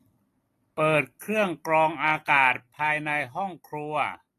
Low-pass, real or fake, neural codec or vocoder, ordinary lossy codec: 14.4 kHz; real; none; AAC, 48 kbps